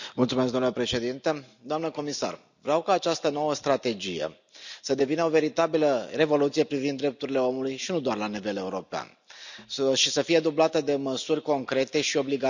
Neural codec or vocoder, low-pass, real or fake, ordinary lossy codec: none; 7.2 kHz; real; none